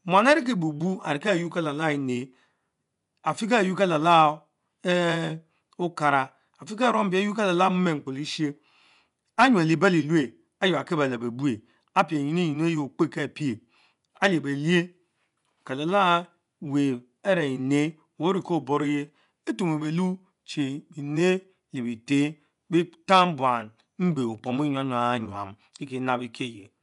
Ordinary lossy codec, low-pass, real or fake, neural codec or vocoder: none; 10.8 kHz; fake; vocoder, 24 kHz, 100 mel bands, Vocos